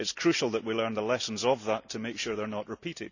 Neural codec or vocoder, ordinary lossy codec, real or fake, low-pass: none; none; real; 7.2 kHz